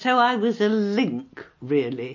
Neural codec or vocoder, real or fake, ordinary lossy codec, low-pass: none; real; MP3, 32 kbps; 7.2 kHz